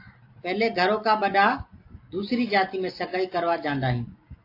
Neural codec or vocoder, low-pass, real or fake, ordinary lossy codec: none; 5.4 kHz; real; AAC, 32 kbps